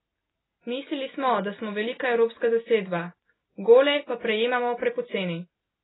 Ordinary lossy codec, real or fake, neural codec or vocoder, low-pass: AAC, 16 kbps; real; none; 7.2 kHz